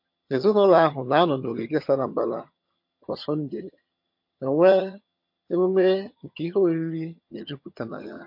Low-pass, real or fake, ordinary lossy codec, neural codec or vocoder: 5.4 kHz; fake; MP3, 32 kbps; vocoder, 22.05 kHz, 80 mel bands, HiFi-GAN